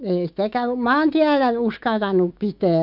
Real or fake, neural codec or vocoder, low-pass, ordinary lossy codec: fake; autoencoder, 48 kHz, 128 numbers a frame, DAC-VAE, trained on Japanese speech; 5.4 kHz; none